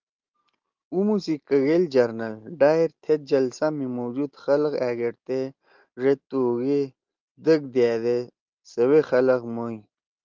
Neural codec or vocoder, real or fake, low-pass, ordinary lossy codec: none; real; 7.2 kHz; Opus, 32 kbps